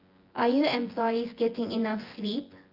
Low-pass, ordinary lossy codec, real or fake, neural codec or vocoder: 5.4 kHz; Opus, 32 kbps; fake; vocoder, 24 kHz, 100 mel bands, Vocos